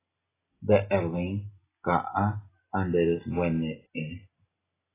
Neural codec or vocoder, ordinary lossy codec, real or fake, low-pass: none; AAC, 16 kbps; real; 3.6 kHz